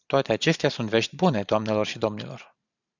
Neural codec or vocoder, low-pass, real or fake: vocoder, 44.1 kHz, 128 mel bands every 512 samples, BigVGAN v2; 7.2 kHz; fake